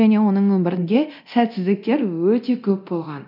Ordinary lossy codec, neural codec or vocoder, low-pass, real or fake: none; codec, 24 kHz, 0.9 kbps, DualCodec; 5.4 kHz; fake